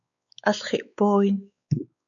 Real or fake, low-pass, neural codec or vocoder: fake; 7.2 kHz; codec, 16 kHz, 4 kbps, X-Codec, WavLM features, trained on Multilingual LibriSpeech